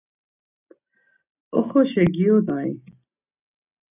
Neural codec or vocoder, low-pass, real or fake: none; 3.6 kHz; real